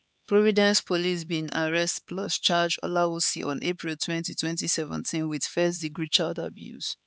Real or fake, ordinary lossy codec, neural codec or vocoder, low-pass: fake; none; codec, 16 kHz, 4 kbps, X-Codec, HuBERT features, trained on LibriSpeech; none